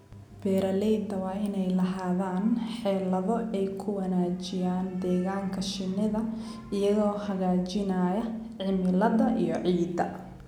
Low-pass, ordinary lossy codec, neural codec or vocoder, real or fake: 19.8 kHz; none; none; real